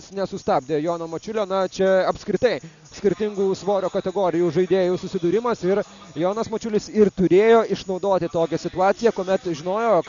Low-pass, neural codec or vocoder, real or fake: 7.2 kHz; none; real